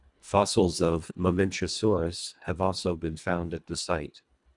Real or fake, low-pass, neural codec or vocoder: fake; 10.8 kHz; codec, 24 kHz, 1.5 kbps, HILCodec